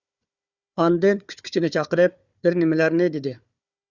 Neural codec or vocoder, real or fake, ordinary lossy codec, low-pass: codec, 16 kHz, 4 kbps, FunCodec, trained on Chinese and English, 50 frames a second; fake; Opus, 64 kbps; 7.2 kHz